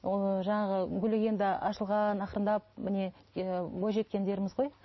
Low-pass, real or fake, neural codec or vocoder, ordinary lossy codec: 7.2 kHz; real; none; MP3, 24 kbps